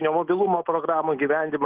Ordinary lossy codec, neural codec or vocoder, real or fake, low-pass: Opus, 16 kbps; none; real; 3.6 kHz